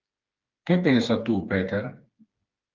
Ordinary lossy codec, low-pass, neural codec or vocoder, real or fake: Opus, 32 kbps; 7.2 kHz; codec, 16 kHz, 4 kbps, FreqCodec, smaller model; fake